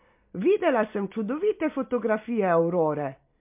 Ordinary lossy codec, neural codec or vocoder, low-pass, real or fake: MP3, 32 kbps; none; 3.6 kHz; real